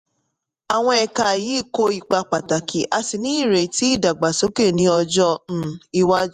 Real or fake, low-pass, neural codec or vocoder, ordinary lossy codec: fake; 14.4 kHz; vocoder, 48 kHz, 128 mel bands, Vocos; none